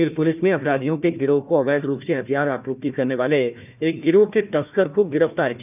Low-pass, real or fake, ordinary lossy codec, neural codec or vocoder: 3.6 kHz; fake; none; codec, 16 kHz, 1 kbps, FunCodec, trained on Chinese and English, 50 frames a second